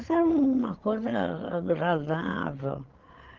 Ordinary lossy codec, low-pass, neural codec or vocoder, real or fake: Opus, 16 kbps; 7.2 kHz; codec, 16 kHz, 8 kbps, FreqCodec, larger model; fake